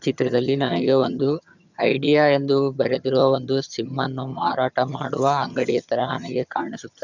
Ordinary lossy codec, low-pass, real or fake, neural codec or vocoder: none; 7.2 kHz; fake; vocoder, 22.05 kHz, 80 mel bands, HiFi-GAN